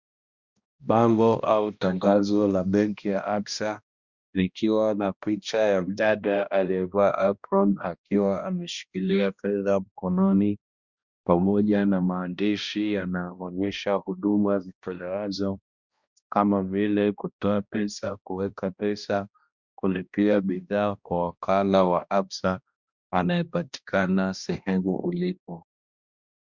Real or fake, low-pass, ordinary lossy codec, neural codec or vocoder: fake; 7.2 kHz; Opus, 64 kbps; codec, 16 kHz, 1 kbps, X-Codec, HuBERT features, trained on balanced general audio